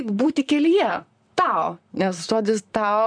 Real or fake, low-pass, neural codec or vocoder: fake; 9.9 kHz; vocoder, 44.1 kHz, 128 mel bands, Pupu-Vocoder